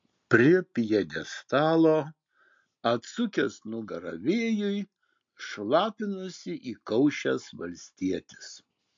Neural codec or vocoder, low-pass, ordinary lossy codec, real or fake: none; 7.2 kHz; MP3, 48 kbps; real